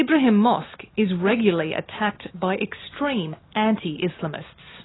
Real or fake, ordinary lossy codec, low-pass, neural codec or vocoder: real; AAC, 16 kbps; 7.2 kHz; none